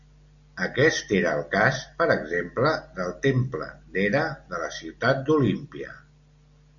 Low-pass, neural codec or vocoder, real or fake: 7.2 kHz; none; real